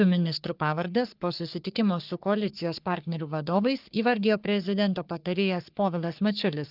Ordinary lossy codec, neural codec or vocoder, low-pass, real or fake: Opus, 24 kbps; codec, 44.1 kHz, 3.4 kbps, Pupu-Codec; 5.4 kHz; fake